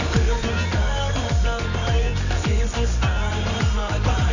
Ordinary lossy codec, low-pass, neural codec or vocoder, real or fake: none; 7.2 kHz; codec, 24 kHz, 0.9 kbps, WavTokenizer, medium music audio release; fake